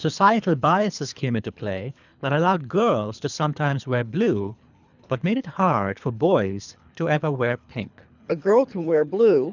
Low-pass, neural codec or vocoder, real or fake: 7.2 kHz; codec, 24 kHz, 3 kbps, HILCodec; fake